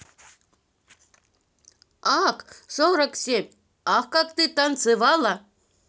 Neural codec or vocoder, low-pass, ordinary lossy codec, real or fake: none; none; none; real